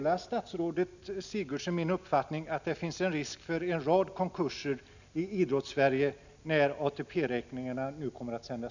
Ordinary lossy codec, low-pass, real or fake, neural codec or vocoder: none; 7.2 kHz; real; none